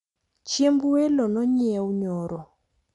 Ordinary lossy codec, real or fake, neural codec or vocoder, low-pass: none; real; none; 10.8 kHz